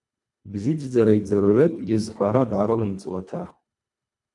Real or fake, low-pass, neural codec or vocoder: fake; 10.8 kHz; codec, 24 kHz, 1.5 kbps, HILCodec